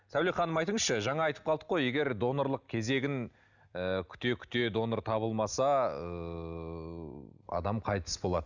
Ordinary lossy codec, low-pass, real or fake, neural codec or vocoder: none; 7.2 kHz; real; none